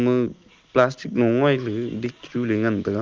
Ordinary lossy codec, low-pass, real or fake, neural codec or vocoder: Opus, 32 kbps; 7.2 kHz; real; none